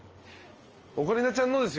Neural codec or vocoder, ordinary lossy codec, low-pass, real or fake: none; Opus, 24 kbps; 7.2 kHz; real